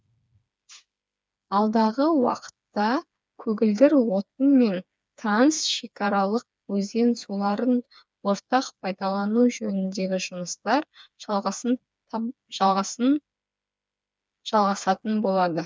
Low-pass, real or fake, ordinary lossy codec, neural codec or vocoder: none; fake; none; codec, 16 kHz, 4 kbps, FreqCodec, smaller model